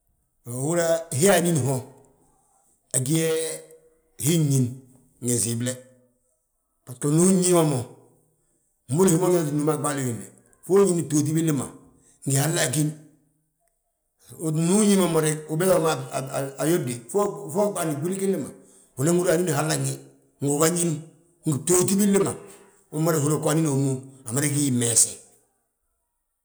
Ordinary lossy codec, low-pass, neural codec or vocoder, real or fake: none; none; none; real